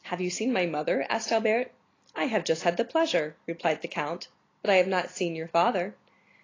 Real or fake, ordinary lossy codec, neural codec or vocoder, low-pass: real; AAC, 32 kbps; none; 7.2 kHz